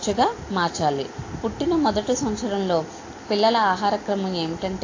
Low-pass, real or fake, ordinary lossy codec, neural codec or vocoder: 7.2 kHz; real; AAC, 32 kbps; none